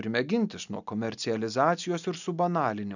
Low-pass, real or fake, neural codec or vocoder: 7.2 kHz; real; none